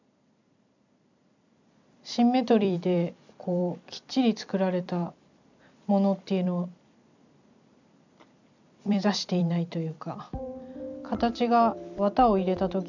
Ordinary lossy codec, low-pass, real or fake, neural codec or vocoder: none; 7.2 kHz; fake; vocoder, 44.1 kHz, 128 mel bands every 256 samples, BigVGAN v2